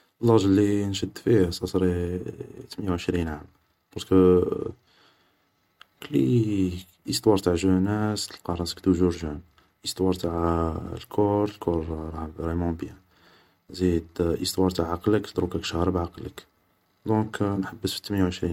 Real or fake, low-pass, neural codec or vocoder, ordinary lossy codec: real; 19.8 kHz; none; MP3, 64 kbps